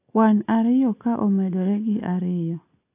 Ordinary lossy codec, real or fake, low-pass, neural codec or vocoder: AAC, 24 kbps; real; 3.6 kHz; none